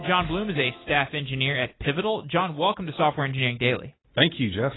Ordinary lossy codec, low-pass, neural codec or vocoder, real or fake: AAC, 16 kbps; 7.2 kHz; none; real